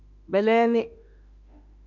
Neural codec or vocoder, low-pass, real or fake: autoencoder, 48 kHz, 32 numbers a frame, DAC-VAE, trained on Japanese speech; 7.2 kHz; fake